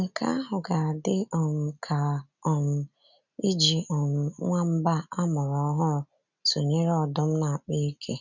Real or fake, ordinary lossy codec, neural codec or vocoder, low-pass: real; none; none; 7.2 kHz